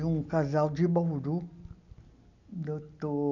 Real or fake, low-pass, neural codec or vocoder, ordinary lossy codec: real; 7.2 kHz; none; none